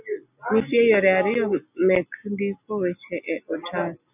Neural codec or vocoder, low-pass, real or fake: none; 3.6 kHz; real